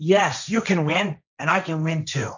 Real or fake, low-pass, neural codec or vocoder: fake; 7.2 kHz; codec, 16 kHz, 1.1 kbps, Voila-Tokenizer